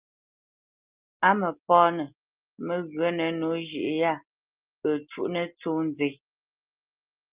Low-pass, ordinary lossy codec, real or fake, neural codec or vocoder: 3.6 kHz; Opus, 32 kbps; real; none